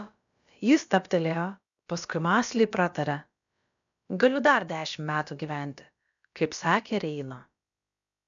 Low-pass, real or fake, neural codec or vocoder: 7.2 kHz; fake; codec, 16 kHz, about 1 kbps, DyCAST, with the encoder's durations